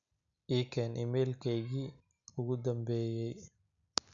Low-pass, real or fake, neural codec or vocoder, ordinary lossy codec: 7.2 kHz; real; none; none